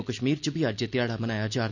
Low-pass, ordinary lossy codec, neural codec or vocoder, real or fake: 7.2 kHz; none; none; real